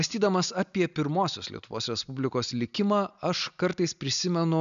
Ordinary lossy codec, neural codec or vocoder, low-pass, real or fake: AAC, 96 kbps; none; 7.2 kHz; real